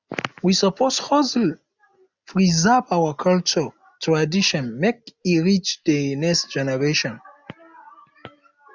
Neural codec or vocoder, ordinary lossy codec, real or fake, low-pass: none; none; real; 7.2 kHz